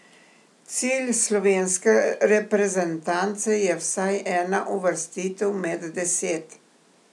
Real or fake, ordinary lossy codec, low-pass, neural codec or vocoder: real; none; none; none